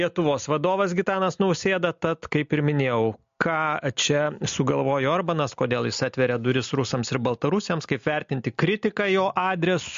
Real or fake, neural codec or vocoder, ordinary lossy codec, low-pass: real; none; MP3, 48 kbps; 7.2 kHz